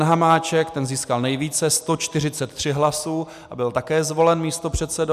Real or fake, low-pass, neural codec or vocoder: real; 14.4 kHz; none